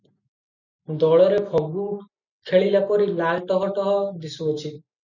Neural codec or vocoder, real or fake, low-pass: none; real; 7.2 kHz